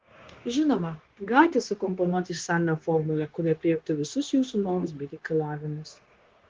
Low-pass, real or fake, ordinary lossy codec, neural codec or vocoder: 7.2 kHz; fake; Opus, 16 kbps; codec, 16 kHz, 0.9 kbps, LongCat-Audio-Codec